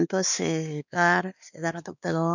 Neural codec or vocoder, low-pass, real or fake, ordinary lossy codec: codec, 16 kHz, 2 kbps, X-Codec, WavLM features, trained on Multilingual LibriSpeech; 7.2 kHz; fake; none